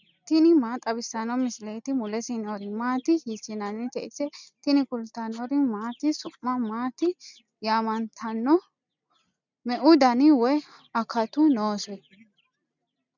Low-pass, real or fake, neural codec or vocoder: 7.2 kHz; real; none